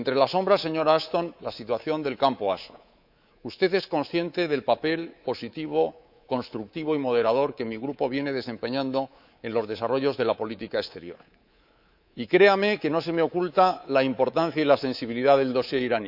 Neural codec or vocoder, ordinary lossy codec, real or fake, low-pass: codec, 24 kHz, 3.1 kbps, DualCodec; none; fake; 5.4 kHz